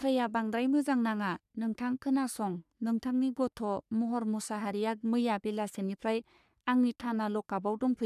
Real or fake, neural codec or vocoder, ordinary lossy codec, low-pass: fake; codec, 44.1 kHz, 3.4 kbps, Pupu-Codec; none; 14.4 kHz